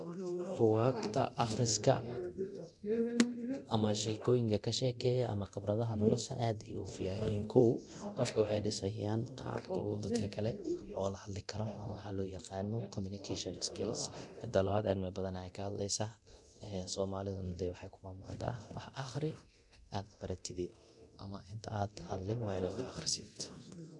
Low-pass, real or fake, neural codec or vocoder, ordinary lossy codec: 10.8 kHz; fake; codec, 24 kHz, 0.9 kbps, DualCodec; MP3, 96 kbps